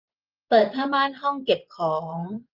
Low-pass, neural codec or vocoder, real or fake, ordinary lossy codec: 5.4 kHz; vocoder, 24 kHz, 100 mel bands, Vocos; fake; Opus, 24 kbps